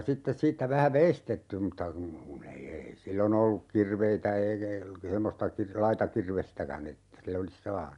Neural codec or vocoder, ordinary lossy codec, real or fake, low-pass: none; none; real; 10.8 kHz